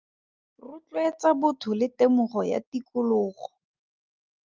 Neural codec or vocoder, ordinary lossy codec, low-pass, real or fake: none; Opus, 24 kbps; 7.2 kHz; real